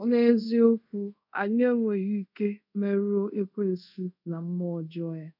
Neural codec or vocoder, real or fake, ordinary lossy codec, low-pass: codec, 24 kHz, 0.5 kbps, DualCodec; fake; none; 5.4 kHz